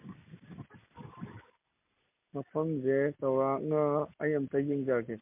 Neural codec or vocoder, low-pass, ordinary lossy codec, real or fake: none; 3.6 kHz; MP3, 32 kbps; real